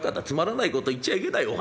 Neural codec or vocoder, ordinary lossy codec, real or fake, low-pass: none; none; real; none